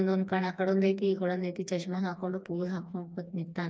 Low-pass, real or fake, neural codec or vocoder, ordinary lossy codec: none; fake; codec, 16 kHz, 2 kbps, FreqCodec, smaller model; none